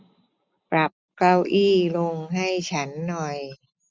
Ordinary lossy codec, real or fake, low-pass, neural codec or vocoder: none; real; none; none